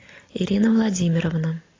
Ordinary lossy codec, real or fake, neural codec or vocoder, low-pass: AAC, 32 kbps; real; none; 7.2 kHz